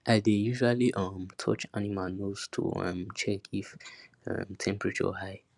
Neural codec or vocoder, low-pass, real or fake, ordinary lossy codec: none; 10.8 kHz; real; none